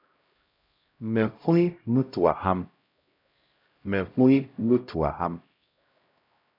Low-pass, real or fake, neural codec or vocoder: 5.4 kHz; fake; codec, 16 kHz, 0.5 kbps, X-Codec, HuBERT features, trained on LibriSpeech